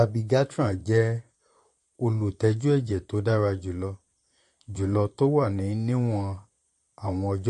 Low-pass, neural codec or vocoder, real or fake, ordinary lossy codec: 14.4 kHz; vocoder, 44.1 kHz, 128 mel bands, Pupu-Vocoder; fake; MP3, 48 kbps